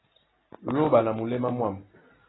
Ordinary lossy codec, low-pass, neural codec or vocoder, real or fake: AAC, 16 kbps; 7.2 kHz; none; real